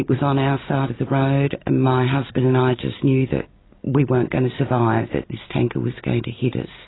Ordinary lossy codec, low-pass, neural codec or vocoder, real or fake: AAC, 16 kbps; 7.2 kHz; none; real